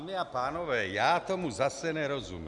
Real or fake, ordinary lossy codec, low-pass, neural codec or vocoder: real; Opus, 64 kbps; 10.8 kHz; none